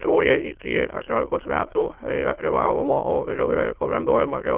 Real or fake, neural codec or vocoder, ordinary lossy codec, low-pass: fake; autoencoder, 22.05 kHz, a latent of 192 numbers a frame, VITS, trained on many speakers; Opus, 16 kbps; 3.6 kHz